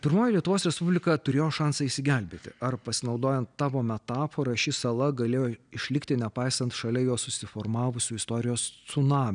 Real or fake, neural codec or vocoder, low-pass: real; none; 9.9 kHz